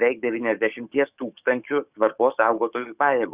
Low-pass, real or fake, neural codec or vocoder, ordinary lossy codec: 3.6 kHz; fake; codec, 44.1 kHz, 7.8 kbps, DAC; Opus, 64 kbps